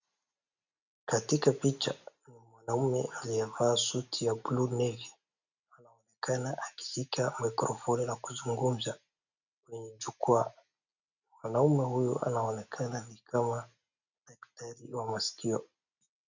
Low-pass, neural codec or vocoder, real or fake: 7.2 kHz; none; real